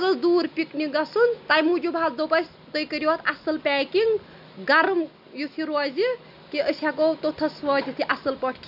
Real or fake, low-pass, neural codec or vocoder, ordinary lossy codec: real; 5.4 kHz; none; none